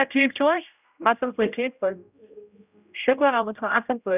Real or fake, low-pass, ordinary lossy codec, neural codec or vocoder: fake; 3.6 kHz; none; codec, 16 kHz, 0.5 kbps, X-Codec, HuBERT features, trained on general audio